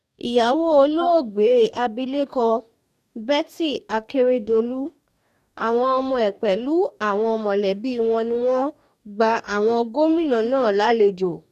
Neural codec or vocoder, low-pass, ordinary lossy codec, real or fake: codec, 44.1 kHz, 2.6 kbps, DAC; 14.4 kHz; none; fake